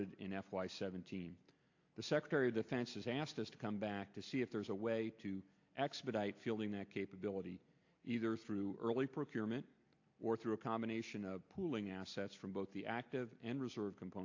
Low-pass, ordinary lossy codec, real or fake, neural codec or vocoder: 7.2 kHz; AAC, 48 kbps; real; none